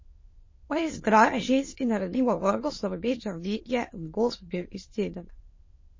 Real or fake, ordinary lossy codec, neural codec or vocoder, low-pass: fake; MP3, 32 kbps; autoencoder, 22.05 kHz, a latent of 192 numbers a frame, VITS, trained on many speakers; 7.2 kHz